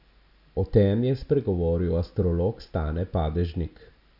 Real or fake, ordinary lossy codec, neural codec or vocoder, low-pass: real; none; none; 5.4 kHz